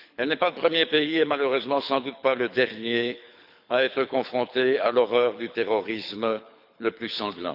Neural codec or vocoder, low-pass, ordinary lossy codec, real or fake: codec, 24 kHz, 6 kbps, HILCodec; 5.4 kHz; none; fake